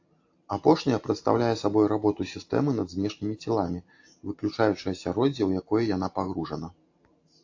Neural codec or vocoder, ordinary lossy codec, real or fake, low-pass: none; AAC, 48 kbps; real; 7.2 kHz